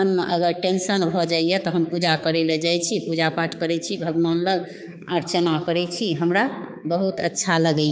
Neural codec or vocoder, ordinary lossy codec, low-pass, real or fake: codec, 16 kHz, 4 kbps, X-Codec, HuBERT features, trained on balanced general audio; none; none; fake